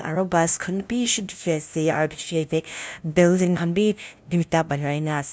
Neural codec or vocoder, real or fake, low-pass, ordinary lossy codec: codec, 16 kHz, 0.5 kbps, FunCodec, trained on LibriTTS, 25 frames a second; fake; none; none